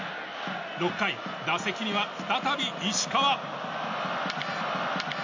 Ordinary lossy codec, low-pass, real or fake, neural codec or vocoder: MP3, 48 kbps; 7.2 kHz; real; none